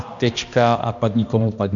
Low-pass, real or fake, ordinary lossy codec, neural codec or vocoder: 7.2 kHz; fake; MP3, 48 kbps; codec, 16 kHz, 1 kbps, X-Codec, HuBERT features, trained on balanced general audio